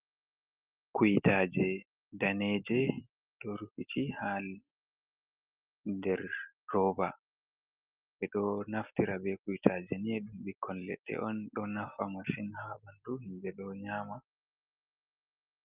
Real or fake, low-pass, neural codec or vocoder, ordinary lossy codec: real; 3.6 kHz; none; Opus, 32 kbps